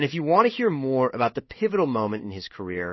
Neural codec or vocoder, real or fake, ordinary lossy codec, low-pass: codec, 16 kHz in and 24 kHz out, 1 kbps, XY-Tokenizer; fake; MP3, 24 kbps; 7.2 kHz